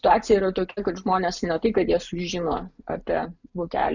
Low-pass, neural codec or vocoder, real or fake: 7.2 kHz; none; real